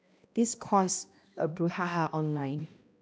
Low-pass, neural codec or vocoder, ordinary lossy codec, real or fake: none; codec, 16 kHz, 1 kbps, X-Codec, HuBERT features, trained on balanced general audio; none; fake